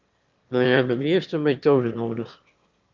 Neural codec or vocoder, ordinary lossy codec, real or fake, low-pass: autoencoder, 22.05 kHz, a latent of 192 numbers a frame, VITS, trained on one speaker; Opus, 24 kbps; fake; 7.2 kHz